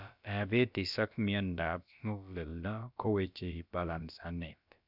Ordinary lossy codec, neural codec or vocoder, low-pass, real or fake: none; codec, 16 kHz, about 1 kbps, DyCAST, with the encoder's durations; 5.4 kHz; fake